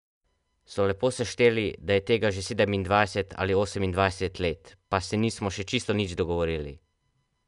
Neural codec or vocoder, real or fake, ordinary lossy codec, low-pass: none; real; MP3, 96 kbps; 10.8 kHz